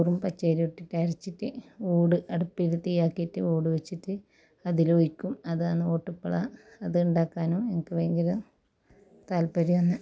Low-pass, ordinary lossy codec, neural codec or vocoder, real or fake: none; none; none; real